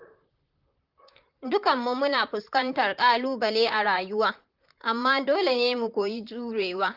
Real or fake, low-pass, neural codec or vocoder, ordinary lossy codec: fake; 5.4 kHz; vocoder, 44.1 kHz, 128 mel bands, Pupu-Vocoder; Opus, 32 kbps